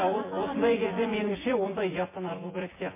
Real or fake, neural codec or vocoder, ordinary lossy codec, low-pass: fake; vocoder, 24 kHz, 100 mel bands, Vocos; MP3, 16 kbps; 3.6 kHz